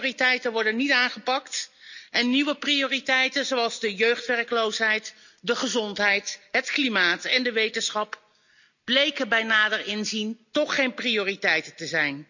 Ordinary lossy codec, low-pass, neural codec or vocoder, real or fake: none; 7.2 kHz; none; real